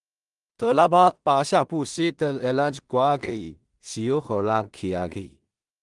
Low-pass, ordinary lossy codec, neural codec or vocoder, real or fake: 10.8 kHz; Opus, 24 kbps; codec, 16 kHz in and 24 kHz out, 0.4 kbps, LongCat-Audio-Codec, two codebook decoder; fake